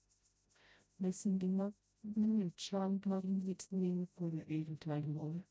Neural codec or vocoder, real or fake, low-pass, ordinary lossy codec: codec, 16 kHz, 0.5 kbps, FreqCodec, smaller model; fake; none; none